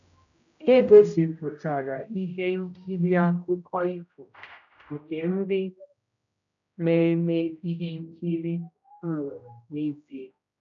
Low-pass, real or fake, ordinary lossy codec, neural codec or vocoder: 7.2 kHz; fake; none; codec, 16 kHz, 0.5 kbps, X-Codec, HuBERT features, trained on general audio